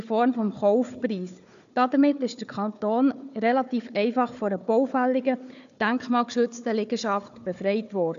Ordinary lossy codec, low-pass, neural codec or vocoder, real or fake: none; 7.2 kHz; codec, 16 kHz, 4 kbps, FunCodec, trained on Chinese and English, 50 frames a second; fake